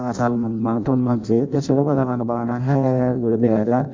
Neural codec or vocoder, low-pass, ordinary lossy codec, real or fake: codec, 16 kHz in and 24 kHz out, 0.6 kbps, FireRedTTS-2 codec; 7.2 kHz; MP3, 64 kbps; fake